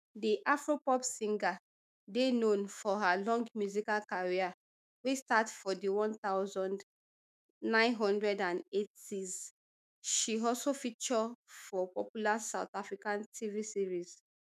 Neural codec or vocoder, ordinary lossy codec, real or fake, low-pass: autoencoder, 48 kHz, 128 numbers a frame, DAC-VAE, trained on Japanese speech; none; fake; 14.4 kHz